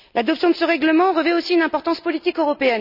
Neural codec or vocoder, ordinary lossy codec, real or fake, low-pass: none; none; real; 5.4 kHz